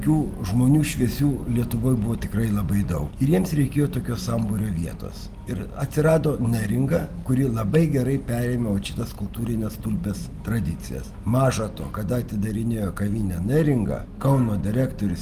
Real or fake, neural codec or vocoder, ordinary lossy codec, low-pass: real; none; Opus, 24 kbps; 14.4 kHz